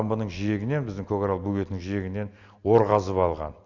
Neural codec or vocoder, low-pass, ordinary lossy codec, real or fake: none; 7.2 kHz; Opus, 64 kbps; real